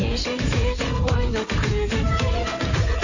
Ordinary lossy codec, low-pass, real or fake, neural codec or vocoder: none; 7.2 kHz; fake; codec, 16 kHz in and 24 kHz out, 2.2 kbps, FireRedTTS-2 codec